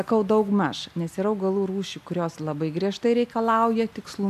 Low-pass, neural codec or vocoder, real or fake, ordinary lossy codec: 14.4 kHz; none; real; MP3, 96 kbps